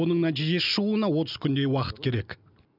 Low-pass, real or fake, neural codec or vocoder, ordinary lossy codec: 5.4 kHz; real; none; none